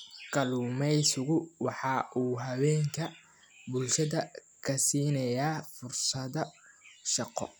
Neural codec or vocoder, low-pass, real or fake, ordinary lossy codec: none; none; real; none